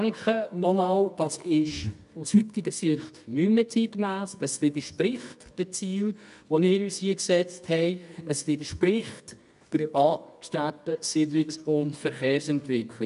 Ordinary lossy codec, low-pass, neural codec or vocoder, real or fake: none; 10.8 kHz; codec, 24 kHz, 0.9 kbps, WavTokenizer, medium music audio release; fake